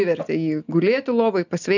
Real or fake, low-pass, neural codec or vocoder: real; 7.2 kHz; none